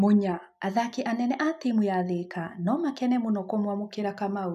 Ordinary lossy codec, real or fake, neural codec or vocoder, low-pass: MP3, 96 kbps; real; none; 14.4 kHz